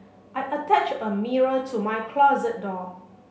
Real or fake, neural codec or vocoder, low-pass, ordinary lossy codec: real; none; none; none